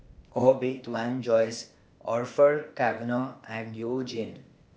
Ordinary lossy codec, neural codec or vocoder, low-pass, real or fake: none; codec, 16 kHz, 0.8 kbps, ZipCodec; none; fake